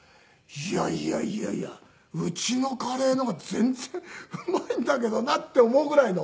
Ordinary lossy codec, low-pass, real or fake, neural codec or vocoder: none; none; real; none